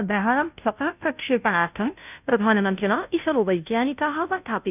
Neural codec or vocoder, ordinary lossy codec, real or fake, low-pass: codec, 16 kHz, 0.5 kbps, FunCodec, trained on Chinese and English, 25 frames a second; none; fake; 3.6 kHz